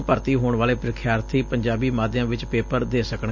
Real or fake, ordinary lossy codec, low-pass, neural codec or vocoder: real; none; 7.2 kHz; none